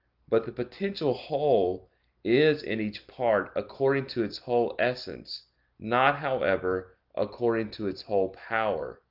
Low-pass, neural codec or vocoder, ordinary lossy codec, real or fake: 5.4 kHz; none; Opus, 32 kbps; real